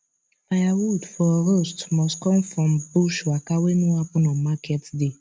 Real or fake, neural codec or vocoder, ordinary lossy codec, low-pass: real; none; none; none